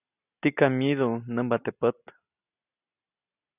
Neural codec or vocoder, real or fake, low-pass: none; real; 3.6 kHz